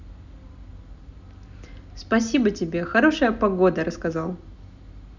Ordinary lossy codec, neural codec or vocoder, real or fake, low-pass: none; none; real; 7.2 kHz